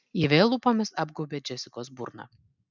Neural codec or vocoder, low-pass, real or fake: none; 7.2 kHz; real